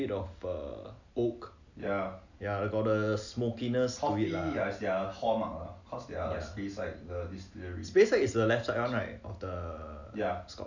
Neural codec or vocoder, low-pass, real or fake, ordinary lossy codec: none; 7.2 kHz; real; none